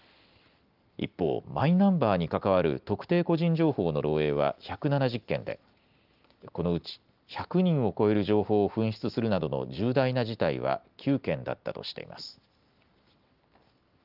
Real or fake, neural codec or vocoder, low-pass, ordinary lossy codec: real; none; 5.4 kHz; Opus, 24 kbps